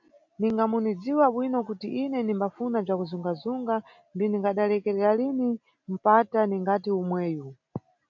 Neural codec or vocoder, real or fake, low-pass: none; real; 7.2 kHz